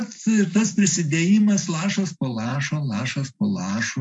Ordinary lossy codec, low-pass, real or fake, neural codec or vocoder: MP3, 48 kbps; 10.8 kHz; real; none